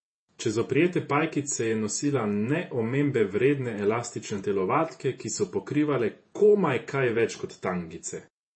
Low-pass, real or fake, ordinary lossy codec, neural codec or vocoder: 9.9 kHz; fake; MP3, 32 kbps; vocoder, 48 kHz, 128 mel bands, Vocos